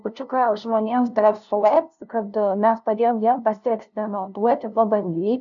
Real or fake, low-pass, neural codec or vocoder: fake; 7.2 kHz; codec, 16 kHz, 0.5 kbps, FunCodec, trained on LibriTTS, 25 frames a second